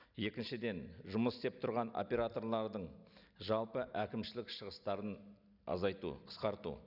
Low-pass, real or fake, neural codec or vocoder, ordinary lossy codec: 5.4 kHz; real; none; none